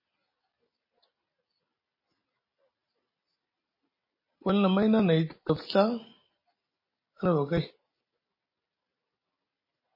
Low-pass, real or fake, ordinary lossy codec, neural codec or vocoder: 5.4 kHz; real; MP3, 24 kbps; none